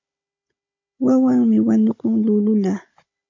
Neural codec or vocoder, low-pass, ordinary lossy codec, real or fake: codec, 16 kHz, 16 kbps, FunCodec, trained on Chinese and English, 50 frames a second; 7.2 kHz; MP3, 48 kbps; fake